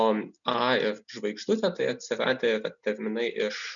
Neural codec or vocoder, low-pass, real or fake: none; 7.2 kHz; real